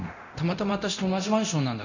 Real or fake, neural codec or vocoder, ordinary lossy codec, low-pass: fake; codec, 24 kHz, 0.9 kbps, DualCodec; none; 7.2 kHz